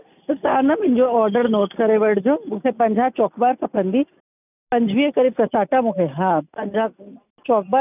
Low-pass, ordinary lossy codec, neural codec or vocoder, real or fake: 3.6 kHz; none; none; real